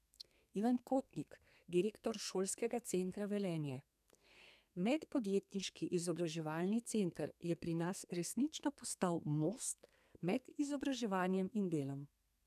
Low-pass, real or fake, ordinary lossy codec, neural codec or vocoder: 14.4 kHz; fake; none; codec, 32 kHz, 1.9 kbps, SNAC